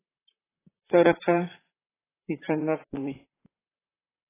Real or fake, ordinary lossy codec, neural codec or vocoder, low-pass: fake; AAC, 16 kbps; vocoder, 44.1 kHz, 128 mel bands, Pupu-Vocoder; 3.6 kHz